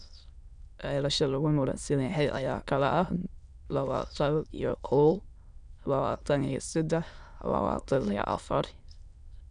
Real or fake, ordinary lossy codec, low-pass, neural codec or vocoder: fake; none; 9.9 kHz; autoencoder, 22.05 kHz, a latent of 192 numbers a frame, VITS, trained on many speakers